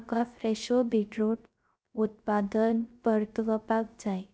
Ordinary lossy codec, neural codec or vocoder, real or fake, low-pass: none; codec, 16 kHz, 0.3 kbps, FocalCodec; fake; none